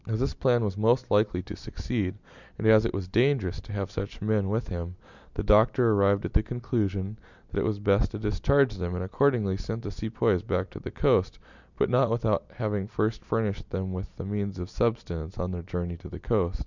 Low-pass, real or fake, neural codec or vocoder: 7.2 kHz; real; none